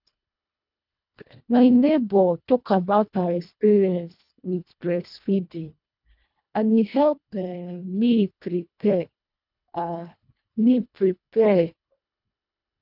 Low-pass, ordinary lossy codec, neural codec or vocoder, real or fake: 5.4 kHz; none; codec, 24 kHz, 1.5 kbps, HILCodec; fake